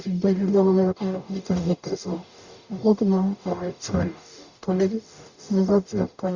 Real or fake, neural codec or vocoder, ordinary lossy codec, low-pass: fake; codec, 44.1 kHz, 0.9 kbps, DAC; Opus, 64 kbps; 7.2 kHz